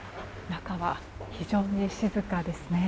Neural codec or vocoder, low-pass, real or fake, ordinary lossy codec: none; none; real; none